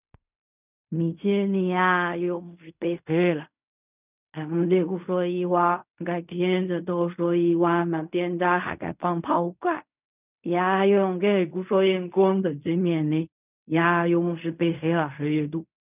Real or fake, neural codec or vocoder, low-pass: fake; codec, 16 kHz in and 24 kHz out, 0.4 kbps, LongCat-Audio-Codec, fine tuned four codebook decoder; 3.6 kHz